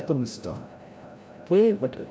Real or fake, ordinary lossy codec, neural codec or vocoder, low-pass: fake; none; codec, 16 kHz, 0.5 kbps, FreqCodec, larger model; none